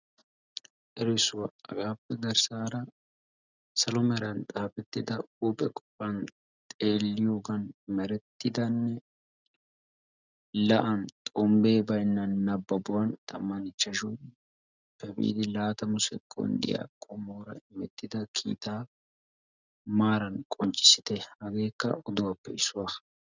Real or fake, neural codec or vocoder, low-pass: real; none; 7.2 kHz